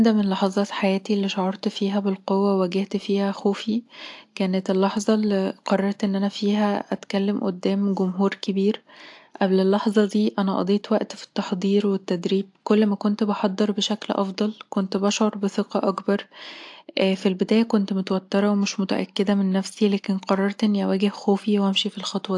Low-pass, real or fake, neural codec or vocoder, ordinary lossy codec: 10.8 kHz; real; none; AAC, 64 kbps